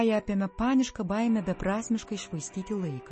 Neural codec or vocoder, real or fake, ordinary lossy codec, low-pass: none; real; MP3, 32 kbps; 10.8 kHz